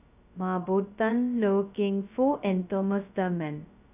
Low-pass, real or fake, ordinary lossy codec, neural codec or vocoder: 3.6 kHz; fake; none; codec, 16 kHz, 0.2 kbps, FocalCodec